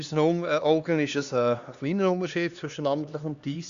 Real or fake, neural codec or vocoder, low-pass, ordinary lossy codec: fake; codec, 16 kHz, 2 kbps, X-Codec, HuBERT features, trained on LibriSpeech; 7.2 kHz; Opus, 64 kbps